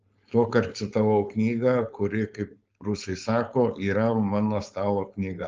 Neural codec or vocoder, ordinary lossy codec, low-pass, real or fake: codec, 16 kHz, 4.8 kbps, FACodec; Opus, 24 kbps; 7.2 kHz; fake